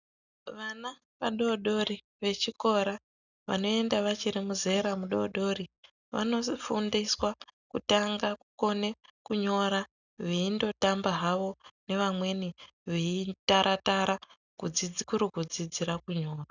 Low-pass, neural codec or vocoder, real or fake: 7.2 kHz; none; real